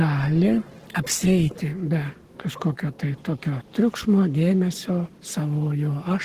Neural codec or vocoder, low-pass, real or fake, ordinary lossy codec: codec, 44.1 kHz, 7.8 kbps, Pupu-Codec; 14.4 kHz; fake; Opus, 16 kbps